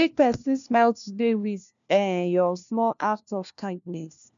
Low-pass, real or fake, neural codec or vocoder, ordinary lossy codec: 7.2 kHz; fake; codec, 16 kHz, 1 kbps, FunCodec, trained on LibriTTS, 50 frames a second; none